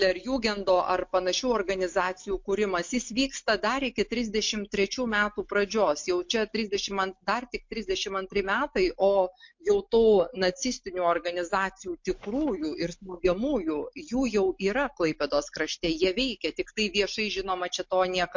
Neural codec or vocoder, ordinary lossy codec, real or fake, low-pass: none; MP3, 48 kbps; real; 7.2 kHz